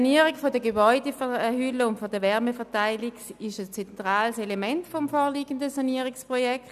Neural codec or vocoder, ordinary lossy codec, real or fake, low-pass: none; none; real; 14.4 kHz